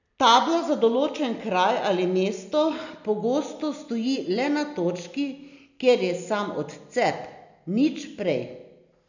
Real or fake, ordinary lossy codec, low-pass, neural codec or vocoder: real; none; 7.2 kHz; none